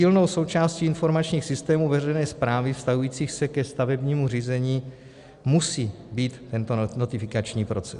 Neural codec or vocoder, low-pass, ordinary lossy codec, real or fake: none; 10.8 kHz; Opus, 64 kbps; real